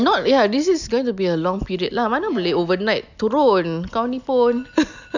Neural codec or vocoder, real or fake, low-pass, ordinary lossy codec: none; real; 7.2 kHz; none